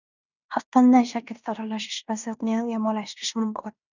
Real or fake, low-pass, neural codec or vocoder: fake; 7.2 kHz; codec, 16 kHz in and 24 kHz out, 0.9 kbps, LongCat-Audio-Codec, fine tuned four codebook decoder